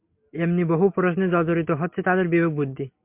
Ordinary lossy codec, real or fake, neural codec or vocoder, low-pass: MP3, 32 kbps; real; none; 3.6 kHz